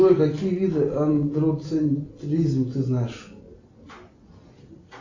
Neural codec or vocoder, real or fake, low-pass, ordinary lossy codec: none; real; 7.2 kHz; AAC, 48 kbps